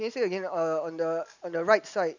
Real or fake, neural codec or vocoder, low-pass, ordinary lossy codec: real; none; 7.2 kHz; none